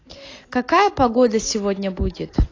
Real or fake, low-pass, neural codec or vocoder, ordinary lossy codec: real; 7.2 kHz; none; AAC, 32 kbps